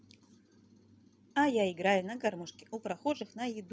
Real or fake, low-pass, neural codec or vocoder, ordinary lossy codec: real; none; none; none